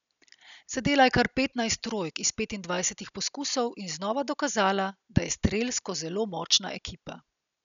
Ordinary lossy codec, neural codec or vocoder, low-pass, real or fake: none; none; 7.2 kHz; real